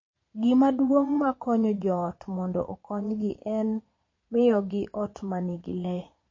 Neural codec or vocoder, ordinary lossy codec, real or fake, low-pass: vocoder, 22.05 kHz, 80 mel bands, Vocos; MP3, 32 kbps; fake; 7.2 kHz